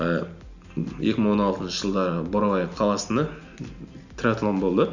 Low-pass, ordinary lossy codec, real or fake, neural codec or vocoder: 7.2 kHz; none; real; none